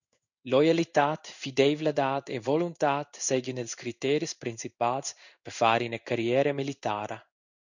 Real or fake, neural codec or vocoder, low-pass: fake; codec, 16 kHz in and 24 kHz out, 1 kbps, XY-Tokenizer; 7.2 kHz